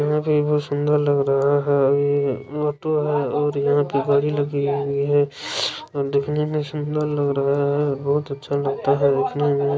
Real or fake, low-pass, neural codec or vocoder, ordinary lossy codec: real; none; none; none